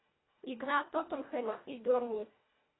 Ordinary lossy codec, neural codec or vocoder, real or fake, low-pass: AAC, 16 kbps; codec, 24 kHz, 1.5 kbps, HILCodec; fake; 7.2 kHz